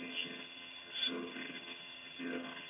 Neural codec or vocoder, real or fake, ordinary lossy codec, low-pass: vocoder, 22.05 kHz, 80 mel bands, HiFi-GAN; fake; MP3, 24 kbps; 3.6 kHz